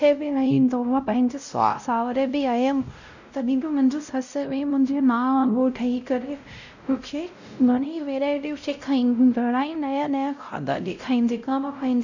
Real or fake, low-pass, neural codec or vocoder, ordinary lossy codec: fake; 7.2 kHz; codec, 16 kHz, 0.5 kbps, X-Codec, WavLM features, trained on Multilingual LibriSpeech; none